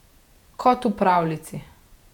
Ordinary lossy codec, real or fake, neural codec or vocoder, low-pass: none; fake; vocoder, 48 kHz, 128 mel bands, Vocos; 19.8 kHz